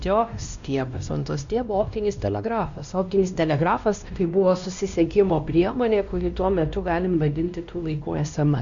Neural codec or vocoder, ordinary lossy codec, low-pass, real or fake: codec, 16 kHz, 1 kbps, X-Codec, WavLM features, trained on Multilingual LibriSpeech; Opus, 64 kbps; 7.2 kHz; fake